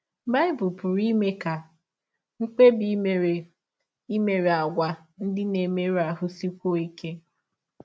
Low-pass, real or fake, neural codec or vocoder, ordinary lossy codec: none; real; none; none